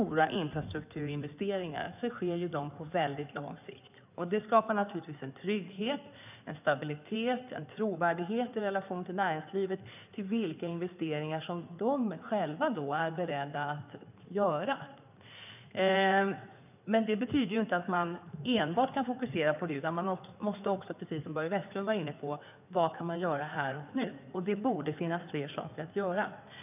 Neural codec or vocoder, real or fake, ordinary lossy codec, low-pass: codec, 16 kHz in and 24 kHz out, 2.2 kbps, FireRedTTS-2 codec; fake; none; 3.6 kHz